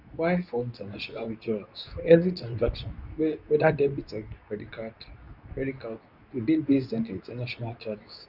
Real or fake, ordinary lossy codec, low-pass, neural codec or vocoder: fake; none; 5.4 kHz; codec, 24 kHz, 0.9 kbps, WavTokenizer, medium speech release version 2